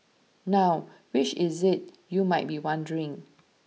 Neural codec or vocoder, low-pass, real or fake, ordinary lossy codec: none; none; real; none